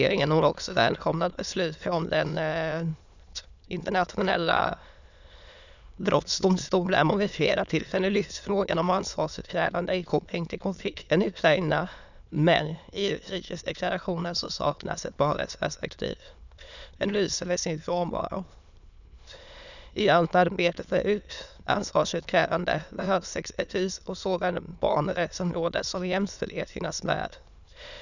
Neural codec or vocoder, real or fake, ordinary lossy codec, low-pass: autoencoder, 22.05 kHz, a latent of 192 numbers a frame, VITS, trained on many speakers; fake; none; 7.2 kHz